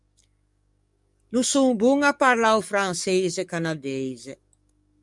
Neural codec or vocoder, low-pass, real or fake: codec, 44.1 kHz, 7.8 kbps, DAC; 10.8 kHz; fake